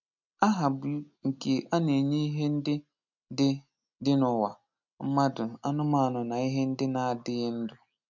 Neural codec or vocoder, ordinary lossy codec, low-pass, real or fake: none; none; 7.2 kHz; real